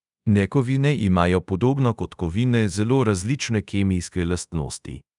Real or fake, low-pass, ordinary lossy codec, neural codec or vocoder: fake; 10.8 kHz; none; codec, 24 kHz, 0.5 kbps, DualCodec